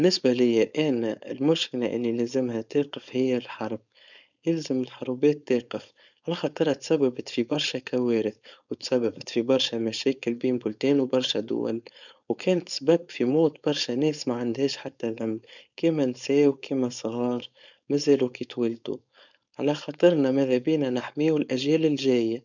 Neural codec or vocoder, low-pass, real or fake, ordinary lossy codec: codec, 16 kHz, 4.8 kbps, FACodec; 7.2 kHz; fake; none